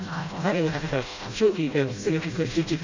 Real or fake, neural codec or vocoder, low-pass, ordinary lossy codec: fake; codec, 16 kHz, 0.5 kbps, FreqCodec, smaller model; 7.2 kHz; AAC, 48 kbps